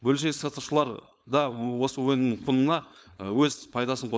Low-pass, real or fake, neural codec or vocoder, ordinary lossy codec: none; fake; codec, 16 kHz, 4.8 kbps, FACodec; none